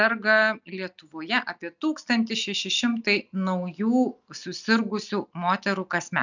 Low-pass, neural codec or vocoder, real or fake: 7.2 kHz; none; real